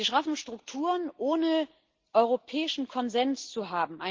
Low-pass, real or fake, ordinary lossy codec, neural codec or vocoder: 7.2 kHz; real; Opus, 16 kbps; none